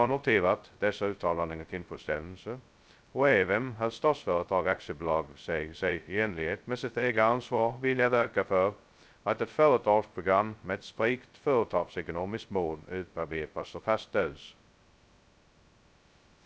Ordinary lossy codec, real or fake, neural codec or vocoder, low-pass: none; fake; codec, 16 kHz, 0.2 kbps, FocalCodec; none